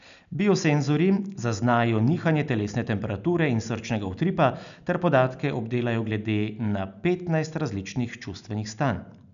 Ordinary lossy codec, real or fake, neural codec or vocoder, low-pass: none; real; none; 7.2 kHz